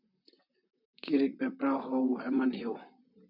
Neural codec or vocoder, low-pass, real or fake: vocoder, 44.1 kHz, 128 mel bands, Pupu-Vocoder; 5.4 kHz; fake